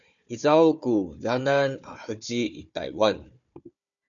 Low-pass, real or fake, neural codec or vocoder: 7.2 kHz; fake; codec, 16 kHz, 4 kbps, FunCodec, trained on Chinese and English, 50 frames a second